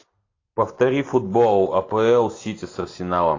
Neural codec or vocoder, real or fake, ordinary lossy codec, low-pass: none; real; AAC, 32 kbps; 7.2 kHz